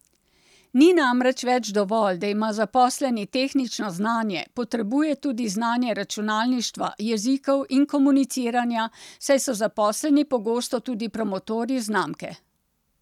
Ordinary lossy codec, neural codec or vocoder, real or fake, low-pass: none; none; real; 19.8 kHz